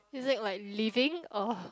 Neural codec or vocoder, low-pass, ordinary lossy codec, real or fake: none; none; none; real